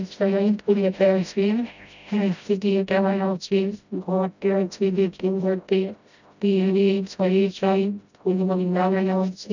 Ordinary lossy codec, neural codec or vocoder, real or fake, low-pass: none; codec, 16 kHz, 0.5 kbps, FreqCodec, smaller model; fake; 7.2 kHz